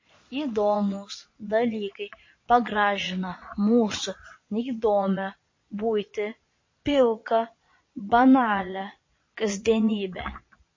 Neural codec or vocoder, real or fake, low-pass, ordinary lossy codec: vocoder, 22.05 kHz, 80 mel bands, Vocos; fake; 7.2 kHz; MP3, 32 kbps